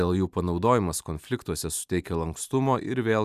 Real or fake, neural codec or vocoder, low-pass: fake; vocoder, 44.1 kHz, 128 mel bands every 512 samples, BigVGAN v2; 14.4 kHz